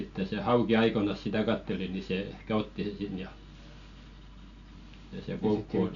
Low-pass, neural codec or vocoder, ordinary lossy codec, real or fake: 7.2 kHz; none; none; real